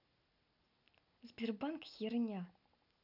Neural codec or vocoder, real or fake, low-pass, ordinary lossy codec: none; real; 5.4 kHz; none